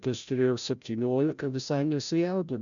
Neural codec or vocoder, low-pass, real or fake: codec, 16 kHz, 0.5 kbps, FreqCodec, larger model; 7.2 kHz; fake